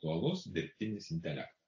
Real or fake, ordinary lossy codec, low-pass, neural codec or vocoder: real; AAC, 48 kbps; 7.2 kHz; none